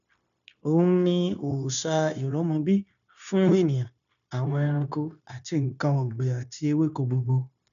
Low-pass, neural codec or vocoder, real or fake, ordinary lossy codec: 7.2 kHz; codec, 16 kHz, 0.9 kbps, LongCat-Audio-Codec; fake; none